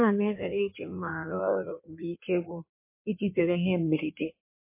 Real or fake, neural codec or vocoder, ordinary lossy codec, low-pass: fake; codec, 16 kHz in and 24 kHz out, 1.1 kbps, FireRedTTS-2 codec; MP3, 24 kbps; 3.6 kHz